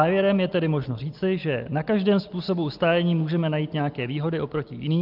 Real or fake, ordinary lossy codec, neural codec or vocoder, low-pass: real; Opus, 32 kbps; none; 5.4 kHz